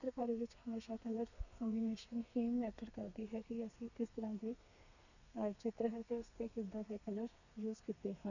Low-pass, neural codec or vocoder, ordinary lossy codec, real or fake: 7.2 kHz; codec, 32 kHz, 1.9 kbps, SNAC; none; fake